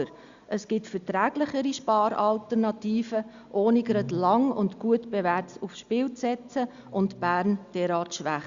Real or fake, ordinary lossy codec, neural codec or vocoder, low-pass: real; Opus, 64 kbps; none; 7.2 kHz